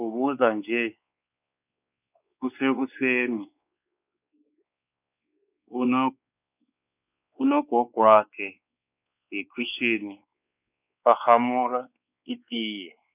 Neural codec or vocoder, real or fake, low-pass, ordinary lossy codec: codec, 16 kHz, 4 kbps, X-Codec, WavLM features, trained on Multilingual LibriSpeech; fake; 3.6 kHz; none